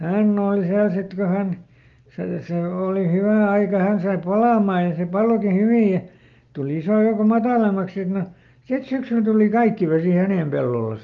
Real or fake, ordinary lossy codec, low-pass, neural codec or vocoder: real; Opus, 32 kbps; 7.2 kHz; none